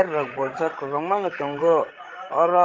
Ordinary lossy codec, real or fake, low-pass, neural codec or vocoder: Opus, 16 kbps; fake; 7.2 kHz; codec, 16 kHz, 16 kbps, FreqCodec, larger model